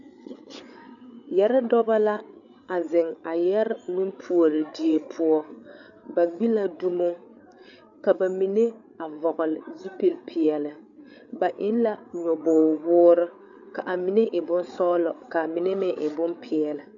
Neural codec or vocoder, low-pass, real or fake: codec, 16 kHz, 8 kbps, FreqCodec, larger model; 7.2 kHz; fake